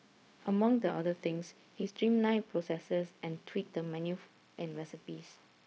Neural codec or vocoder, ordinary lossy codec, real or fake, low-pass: codec, 16 kHz, 0.4 kbps, LongCat-Audio-Codec; none; fake; none